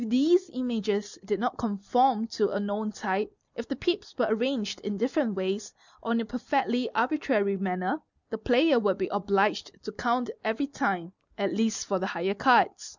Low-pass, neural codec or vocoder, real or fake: 7.2 kHz; none; real